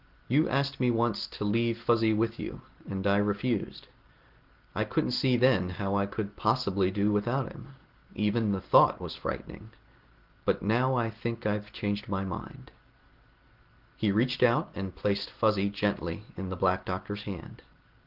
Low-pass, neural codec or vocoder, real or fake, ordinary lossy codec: 5.4 kHz; none; real; Opus, 16 kbps